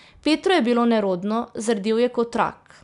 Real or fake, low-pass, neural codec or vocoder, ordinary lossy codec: real; 10.8 kHz; none; none